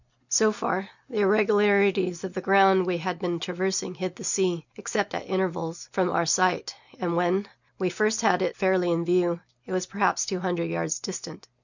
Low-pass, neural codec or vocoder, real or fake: 7.2 kHz; none; real